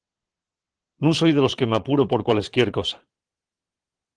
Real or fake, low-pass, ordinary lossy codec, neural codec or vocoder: fake; 9.9 kHz; Opus, 16 kbps; vocoder, 22.05 kHz, 80 mel bands, Vocos